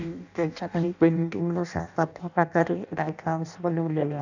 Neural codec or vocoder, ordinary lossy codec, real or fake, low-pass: codec, 16 kHz in and 24 kHz out, 0.6 kbps, FireRedTTS-2 codec; none; fake; 7.2 kHz